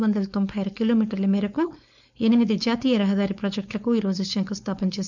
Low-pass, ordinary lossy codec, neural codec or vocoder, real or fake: 7.2 kHz; none; codec, 16 kHz, 4.8 kbps, FACodec; fake